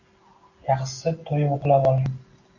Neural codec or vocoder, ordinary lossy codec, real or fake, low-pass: none; AAC, 48 kbps; real; 7.2 kHz